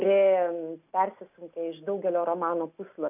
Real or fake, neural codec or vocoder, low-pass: real; none; 3.6 kHz